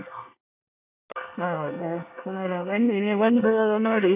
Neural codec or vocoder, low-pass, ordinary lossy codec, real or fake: codec, 24 kHz, 1 kbps, SNAC; 3.6 kHz; none; fake